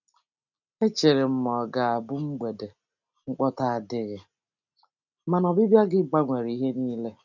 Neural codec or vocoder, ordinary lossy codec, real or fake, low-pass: none; none; real; 7.2 kHz